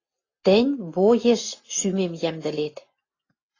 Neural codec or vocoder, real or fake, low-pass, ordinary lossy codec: none; real; 7.2 kHz; AAC, 32 kbps